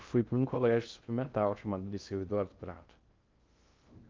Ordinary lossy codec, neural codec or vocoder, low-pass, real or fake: Opus, 24 kbps; codec, 16 kHz in and 24 kHz out, 0.6 kbps, FocalCodec, streaming, 2048 codes; 7.2 kHz; fake